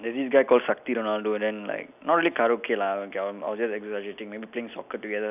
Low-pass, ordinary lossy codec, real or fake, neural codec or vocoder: 3.6 kHz; none; real; none